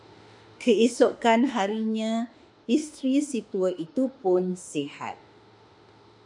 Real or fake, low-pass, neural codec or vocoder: fake; 10.8 kHz; autoencoder, 48 kHz, 32 numbers a frame, DAC-VAE, trained on Japanese speech